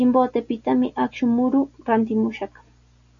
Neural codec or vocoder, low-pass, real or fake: none; 7.2 kHz; real